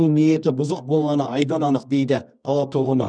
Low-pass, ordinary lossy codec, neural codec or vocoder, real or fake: 9.9 kHz; none; codec, 24 kHz, 0.9 kbps, WavTokenizer, medium music audio release; fake